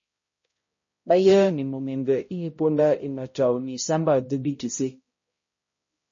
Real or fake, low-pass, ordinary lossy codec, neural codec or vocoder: fake; 7.2 kHz; MP3, 32 kbps; codec, 16 kHz, 0.5 kbps, X-Codec, HuBERT features, trained on balanced general audio